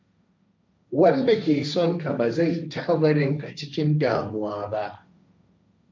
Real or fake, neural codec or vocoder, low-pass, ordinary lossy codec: fake; codec, 16 kHz, 1.1 kbps, Voila-Tokenizer; none; none